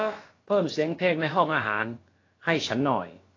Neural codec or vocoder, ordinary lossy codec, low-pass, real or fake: codec, 16 kHz, about 1 kbps, DyCAST, with the encoder's durations; AAC, 32 kbps; 7.2 kHz; fake